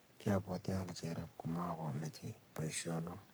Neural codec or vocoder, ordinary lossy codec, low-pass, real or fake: codec, 44.1 kHz, 3.4 kbps, Pupu-Codec; none; none; fake